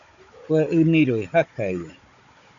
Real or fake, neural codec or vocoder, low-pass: fake; codec, 16 kHz, 16 kbps, FunCodec, trained on Chinese and English, 50 frames a second; 7.2 kHz